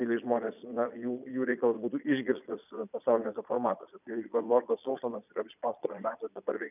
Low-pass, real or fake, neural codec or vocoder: 3.6 kHz; fake; vocoder, 22.05 kHz, 80 mel bands, Vocos